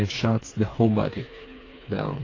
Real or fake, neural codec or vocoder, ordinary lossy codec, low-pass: fake; codec, 16 kHz, 4 kbps, FreqCodec, smaller model; AAC, 32 kbps; 7.2 kHz